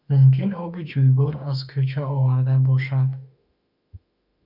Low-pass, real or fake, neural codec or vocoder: 5.4 kHz; fake; autoencoder, 48 kHz, 32 numbers a frame, DAC-VAE, trained on Japanese speech